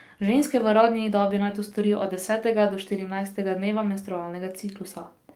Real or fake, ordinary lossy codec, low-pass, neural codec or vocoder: fake; Opus, 32 kbps; 19.8 kHz; codec, 44.1 kHz, 7.8 kbps, DAC